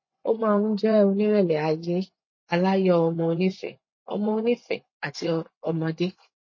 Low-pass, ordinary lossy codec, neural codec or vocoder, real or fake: 7.2 kHz; MP3, 32 kbps; vocoder, 24 kHz, 100 mel bands, Vocos; fake